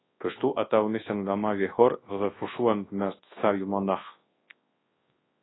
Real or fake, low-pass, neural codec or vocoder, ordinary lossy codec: fake; 7.2 kHz; codec, 24 kHz, 0.9 kbps, WavTokenizer, large speech release; AAC, 16 kbps